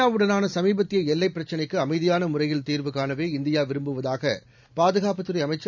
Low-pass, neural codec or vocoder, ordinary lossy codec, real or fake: 7.2 kHz; none; none; real